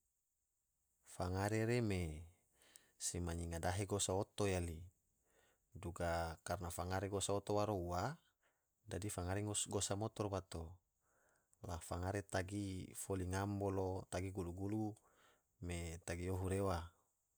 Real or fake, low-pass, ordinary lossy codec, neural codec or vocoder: real; none; none; none